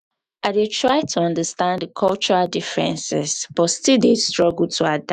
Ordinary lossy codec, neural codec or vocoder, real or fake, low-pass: none; autoencoder, 48 kHz, 128 numbers a frame, DAC-VAE, trained on Japanese speech; fake; 14.4 kHz